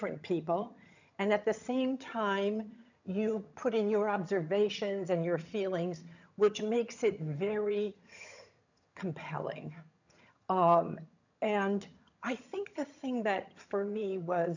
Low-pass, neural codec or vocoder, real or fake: 7.2 kHz; vocoder, 22.05 kHz, 80 mel bands, HiFi-GAN; fake